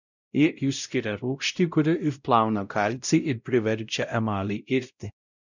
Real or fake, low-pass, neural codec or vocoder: fake; 7.2 kHz; codec, 16 kHz, 0.5 kbps, X-Codec, WavLM features, trained on Multilingual LibriSpeech